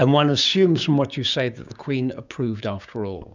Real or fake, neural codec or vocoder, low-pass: fake; codec, 16 kHz, 6 kbps, DAC; 7.2 kHz